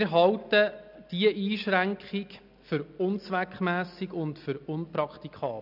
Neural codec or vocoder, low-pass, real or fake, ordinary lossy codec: none; 5.4 kHz; real; none